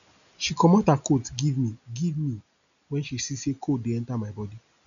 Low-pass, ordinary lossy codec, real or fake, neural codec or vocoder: 7.2 kHz; none; real; none